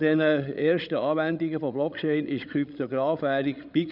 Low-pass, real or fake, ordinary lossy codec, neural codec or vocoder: 5.4 kHz; fake; none; codec, 16 kHz, 16 kbps, FreqCodec, larger model